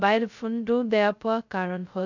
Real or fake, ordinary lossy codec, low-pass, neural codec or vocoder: fake; none; 7.2 kHz; codec, 16 kHz, 0.2 kbps, FocalCodec